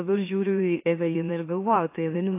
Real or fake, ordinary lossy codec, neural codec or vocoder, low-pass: fake; AAC, 24 kbps; autoencoder, 44.1 kHz, a latent of 192 numbers a frame, MeloTTS; 3.6 kHz